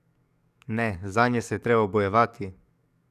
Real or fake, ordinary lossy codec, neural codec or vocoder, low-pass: fake; none; codec, 44.1 kHz, 7.8 kbps, DAC; 14.4 kHz